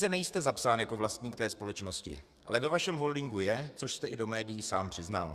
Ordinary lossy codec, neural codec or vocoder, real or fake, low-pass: Opus, 64 kbps; codec, 32 kHz, 1.9 kbps, SNAC; fake; 14.4 kHz